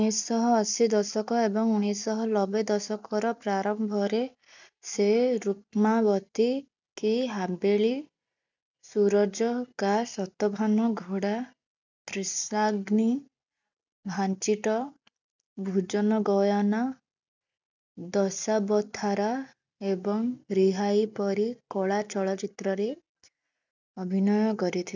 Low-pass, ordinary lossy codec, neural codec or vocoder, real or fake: 7.2 kHz; none; none; real